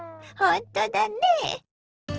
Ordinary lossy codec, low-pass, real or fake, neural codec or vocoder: Opus, 16 kbps; 7.2 kHz; real; none